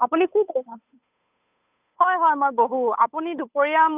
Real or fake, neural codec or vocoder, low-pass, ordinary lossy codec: fake; codec, 16 kHz, 8 kbps, FunCodec, trained on Chinese and English, 25 frames a second; 3.6 kHz; none